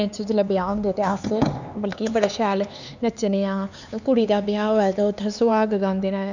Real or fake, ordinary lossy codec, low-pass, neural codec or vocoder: fake; none; 7.2 kHz; codec, 16 kHz, 4 kbps, X-Codec, HuBERT features, trained on LibriSpeech